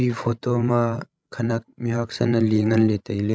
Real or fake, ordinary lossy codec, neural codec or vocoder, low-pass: fake; none; codec, 16 kHz, 16 kbps, FreqCodec, larger model; none